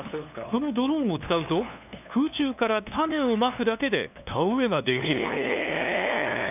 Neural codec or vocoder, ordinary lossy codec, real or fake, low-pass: codec, 16 kHz, 2 kbps, FunCodec, trained on LibriTTS, 25 frames a second; none; fake; 3.6 kHz